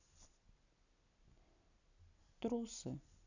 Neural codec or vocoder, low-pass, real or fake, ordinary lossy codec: none; 7.2 kHz; real; none